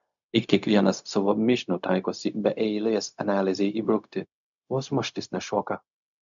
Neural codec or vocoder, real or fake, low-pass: codec, 16 kHz, 0.4 kbps, LongCat-Audio-Codec; fake; 7.2 kHz